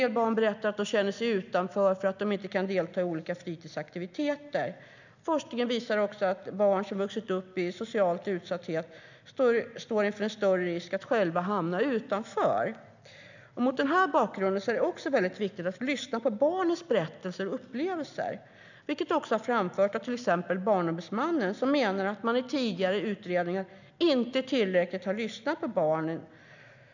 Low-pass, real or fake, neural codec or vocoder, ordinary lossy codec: 7.2 kHz; real; none; none